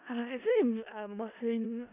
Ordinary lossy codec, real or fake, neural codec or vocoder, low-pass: none; fake; codec, 16 kHz in and 24 kHz out, 0.4 kbps, LongCat-Audio-Codec, four codebook decoder; 3.6 kHz